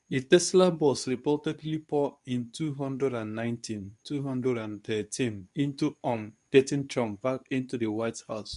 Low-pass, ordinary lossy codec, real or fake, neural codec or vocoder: 10.8 kHz; none; fake; codec, 24 kHz, 0.9 kbps, WavTokenizer, medium speech release version 2